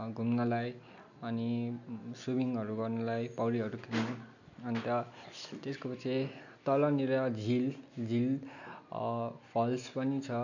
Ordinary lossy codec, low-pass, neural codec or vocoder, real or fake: none; 7.2 kHz; none; real